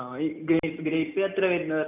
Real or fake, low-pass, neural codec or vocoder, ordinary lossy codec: real; 3.6 kHz; none; none